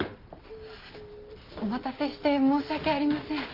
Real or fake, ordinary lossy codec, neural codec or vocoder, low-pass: real; Opus, 24 kbps; none; 5.4 kHz